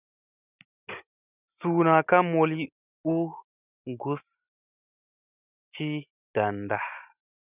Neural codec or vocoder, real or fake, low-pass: none; real; 3.6 kHz